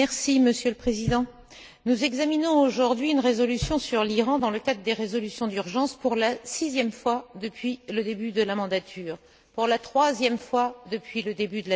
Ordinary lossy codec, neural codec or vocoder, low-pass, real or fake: none; none; none; real